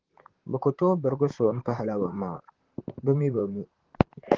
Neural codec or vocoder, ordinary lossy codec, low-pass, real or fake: vocoder, 44.1 kHz, 128 mel bands, Pupu-Vocoder; Opus, 16 kbps; 7.2 kHz; fake